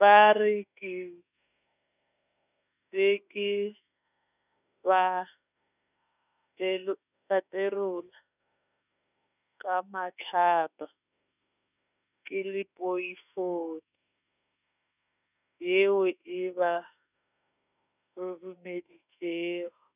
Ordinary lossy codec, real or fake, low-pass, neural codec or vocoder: none; fake; 3.6 kHz; autoencoder, 48 kHz, 32 numbers a frame, DAC-VAE, trained on Japanese speech